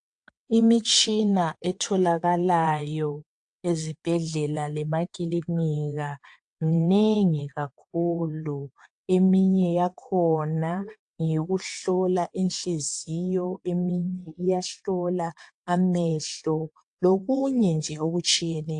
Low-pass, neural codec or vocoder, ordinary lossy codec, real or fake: 9.9 kHz; vocoder, 22.05 kHz, 80 mel bands, WaveNeXt; AAC, 64 kbps; fake